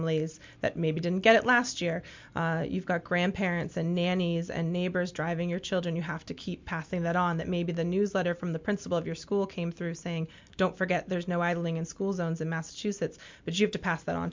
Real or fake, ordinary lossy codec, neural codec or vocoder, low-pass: real; MP3, 64 kbps; none; 7.2 kHz